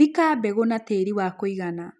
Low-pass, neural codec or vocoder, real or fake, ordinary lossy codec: none; none; real; none